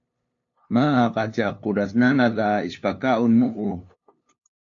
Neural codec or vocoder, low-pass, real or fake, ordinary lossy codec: codec, 16 kHz, 2 kbps, FunCodec, trained on LibriTTS, 25 frames a second; 7.2 kHz; fake; AAC, 48 kbps